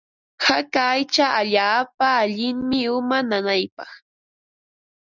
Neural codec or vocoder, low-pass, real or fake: none; 7.2 kHz; real